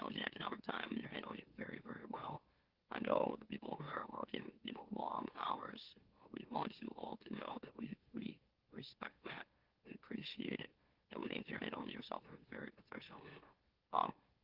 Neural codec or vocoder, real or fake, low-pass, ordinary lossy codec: autoencoder, 44.1 kHz, a latent of 192 numbers a frame, MeloTTS; fake; 5.4 kHz; Opus, 16 kbps